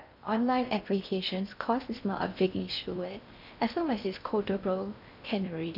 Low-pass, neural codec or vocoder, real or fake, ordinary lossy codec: 5.4 kHz; codec, 16 kHz in and 24 kHz out, 0.6 kbps, FocalCodec, streaming, 2048 codes; fake; none